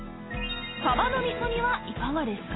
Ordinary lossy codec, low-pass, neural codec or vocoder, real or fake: AAC, 16 kbps; 7.2 kHz; none; real